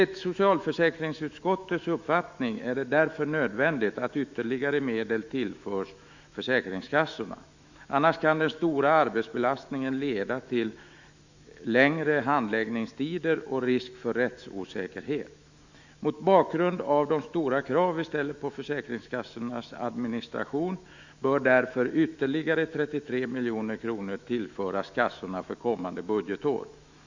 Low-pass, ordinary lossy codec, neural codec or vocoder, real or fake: 7.2 kHz; Opus, 64 kbps; autoencoder, 48 kHz, 128 numbers a frame, DAC-VAE, trained on Japanese speech; fake